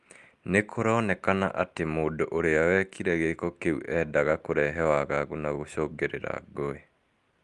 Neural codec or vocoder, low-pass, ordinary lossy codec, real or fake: none; 10.8 kHz; Opus, 32 kbps; real